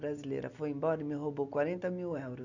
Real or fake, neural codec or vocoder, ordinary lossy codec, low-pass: real; none; none; 7.2 kHz